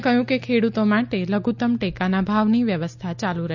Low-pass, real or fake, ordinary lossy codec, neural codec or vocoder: 7.2 kHz; real; MP3, 64 kbps; none